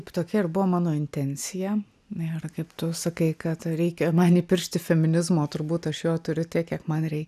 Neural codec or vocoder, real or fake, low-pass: none; real; 14.4 kHz